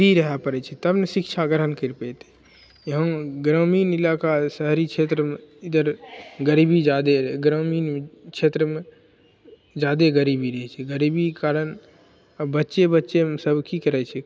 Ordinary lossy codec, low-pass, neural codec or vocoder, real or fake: none; none; none; real